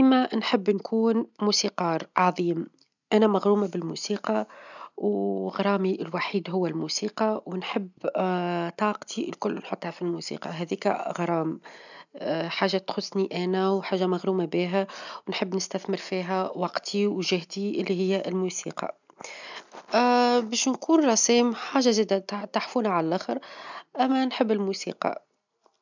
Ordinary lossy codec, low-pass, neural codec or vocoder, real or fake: none; 7.2 kHz; none; real